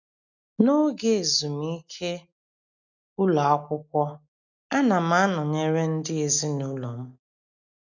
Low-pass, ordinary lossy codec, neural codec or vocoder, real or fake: 7.2 kHz; none; none; real